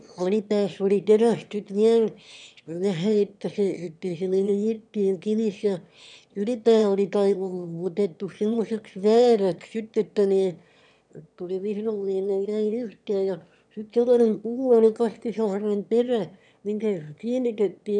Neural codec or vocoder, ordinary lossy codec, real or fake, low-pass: autoencoder, 22.05 kHz, a latent of 192 numbers a frame, VITS, trained on one speaker; none; fake; 9.9 kHz